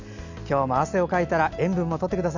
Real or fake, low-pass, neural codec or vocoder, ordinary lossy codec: real; 7.2 kHz; none; none